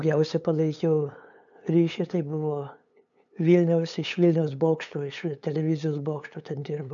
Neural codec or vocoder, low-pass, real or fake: codec, 16 kHz, 8 kbps, FunCodec, trained on LibriTTS, 25 frames a second; 7.2 kHz; fake